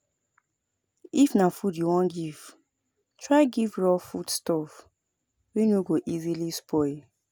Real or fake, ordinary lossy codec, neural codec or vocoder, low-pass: real; none; none; none